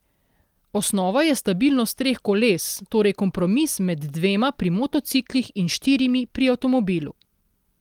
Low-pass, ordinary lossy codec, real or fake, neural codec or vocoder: 19.8 kHz; Opus, 24 kbps; real; none